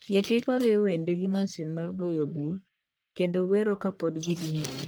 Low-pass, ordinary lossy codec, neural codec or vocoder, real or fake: none; none; codec, 44.1 kHz, 1.7 kbps, Pupu-Codec; fake